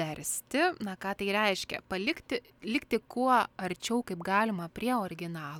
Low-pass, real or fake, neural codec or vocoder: 19.8 kHz; real; none